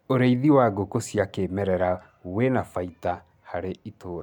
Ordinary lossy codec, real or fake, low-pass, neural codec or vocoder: MP3, 96 kbps; real; 19.8 kHz; none